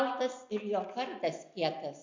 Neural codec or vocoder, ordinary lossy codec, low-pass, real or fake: none; MP3, 64 kbps; 7.2 kHz; real